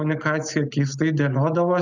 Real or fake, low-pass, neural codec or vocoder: real; 7.2 kHz; none